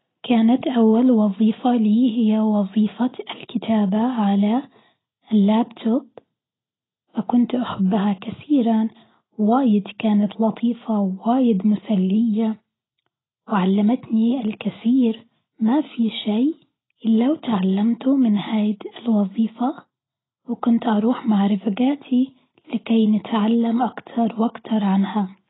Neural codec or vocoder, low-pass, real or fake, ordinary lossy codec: none; 7.2 kHz; real; AAC, 16 kbps